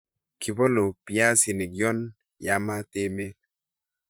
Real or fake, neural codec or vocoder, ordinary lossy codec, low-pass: fake; vocoder, 44.1 kHz, 128 mel bands, Pupu-Vocoder; none; none